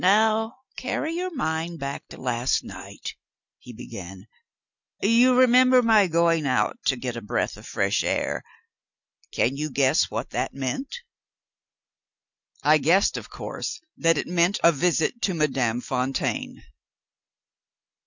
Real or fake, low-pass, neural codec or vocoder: real; 7.2 kHz; none